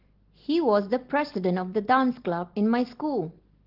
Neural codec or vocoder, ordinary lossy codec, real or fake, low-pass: none; Opus, 16 kbps; real; 5.4 kHz